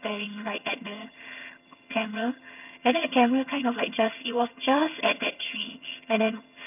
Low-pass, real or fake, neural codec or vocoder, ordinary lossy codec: 3.6 kHz; fake; vocoder, 22.05 kHz, 80 mel bands, HiFi-GAN; none